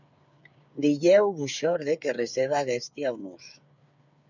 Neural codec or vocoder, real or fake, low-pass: codec, 16 kHz, 8 kbps, FreqCodec, smaller model; fake; 7.2 kHz